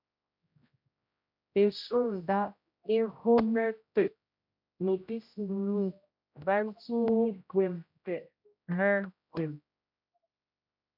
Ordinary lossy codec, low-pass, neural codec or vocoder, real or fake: MP3, 48 kbps; 5.4 kHz; codec, 16 kHz, 0.5 kbps, X-Codec, HuBERT features, trained on general audio; fake